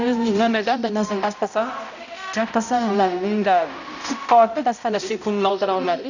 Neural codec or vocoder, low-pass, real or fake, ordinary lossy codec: codec, 16 kHz, 0.5 kbps, X-Codec, HuBERT features, trained on balanced general audio; 7.2 kHz; fake; none